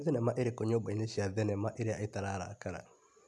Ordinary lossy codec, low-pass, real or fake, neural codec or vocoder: none; none; real; none